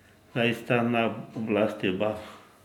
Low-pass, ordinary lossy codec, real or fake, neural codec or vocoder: 19.8 kHz; none; real; none